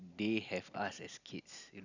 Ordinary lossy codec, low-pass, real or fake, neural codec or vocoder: none; 7.2 kHz; real; none